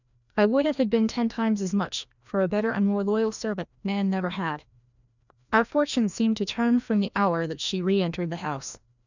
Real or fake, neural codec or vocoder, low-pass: fake; codec, 16 kHz, 1 kbps, FreqCodec, larger model; 7.2 kHz